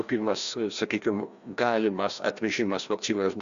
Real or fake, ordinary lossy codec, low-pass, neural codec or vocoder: fake; Opus, 64 kbps; 7.2 kHz; codec, 16 kHz, 1 kbps, FreqCodec, larger model